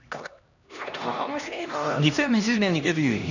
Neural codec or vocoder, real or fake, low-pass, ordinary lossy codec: codec, 16 kHz, 1 kbps, X-Codec, HuBERT features, trained on LibriSpeech; fake; 7.2 kHz; none